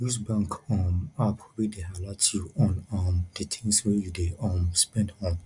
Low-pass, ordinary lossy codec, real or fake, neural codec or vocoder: 10.8 kHz; none; real; none